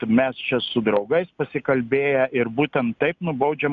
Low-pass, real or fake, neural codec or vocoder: 7.2 kHz; real; none